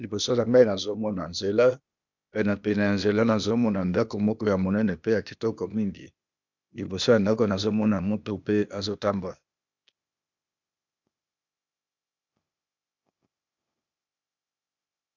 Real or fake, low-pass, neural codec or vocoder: fake; 7.2 kHz; codec, 16 kHz, 0.8 kbps, ZipCodec